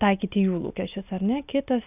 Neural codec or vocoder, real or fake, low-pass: none; real; 3.6 kHz